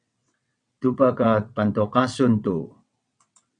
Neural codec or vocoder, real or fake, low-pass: vocoder, 22.05 kHz, 80 mel bands, WaveNeXt; fake; 9.9 kHz